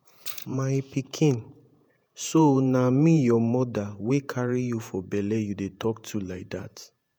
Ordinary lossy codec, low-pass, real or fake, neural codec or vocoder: none; none; fake; vocoder, 48 kHz, 128 mel bands, Vocos